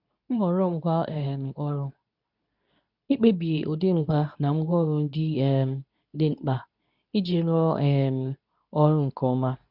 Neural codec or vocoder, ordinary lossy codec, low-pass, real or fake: codec, 24 kHz, 0.9 kbps, WavTokenizer, medium speech release version 2; none; 5.4 kHz; fake